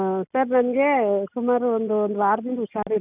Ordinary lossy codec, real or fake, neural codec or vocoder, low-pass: none; real; none; 3.6 kHz